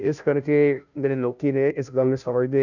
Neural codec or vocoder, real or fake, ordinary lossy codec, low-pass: codec, 16 kHz, 0.5 kbps, FunCodec, trained on Chinese and English, 25 frames a second; fake; none; 7.2 kHz